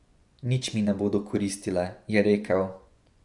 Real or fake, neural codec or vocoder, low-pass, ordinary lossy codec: fake; vocoder, 24 kHz, 100 mel bands, Vocos; 10.8 kHz; none